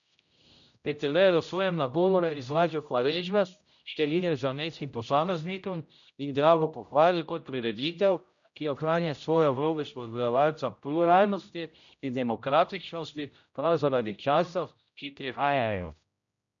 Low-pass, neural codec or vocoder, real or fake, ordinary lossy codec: 7.2 kHz; codec, 16 kHz, 0.5 kbps, X-Codec, HuBERT features, trained on general audio; fake; MP3, 96 kbps